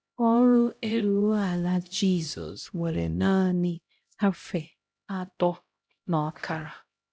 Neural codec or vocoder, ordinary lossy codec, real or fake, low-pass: codec, 16 kHz, 0.5 kbps, X-Codec, HuBERT features, trained on LibriSpeech; none; fake; none